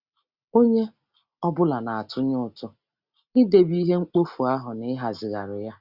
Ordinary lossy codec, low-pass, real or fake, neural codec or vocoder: Opus, 64 kbps; 5.4 kHz; real; none